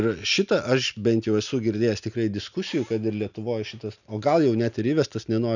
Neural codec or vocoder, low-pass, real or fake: none; 7.2 kHz; real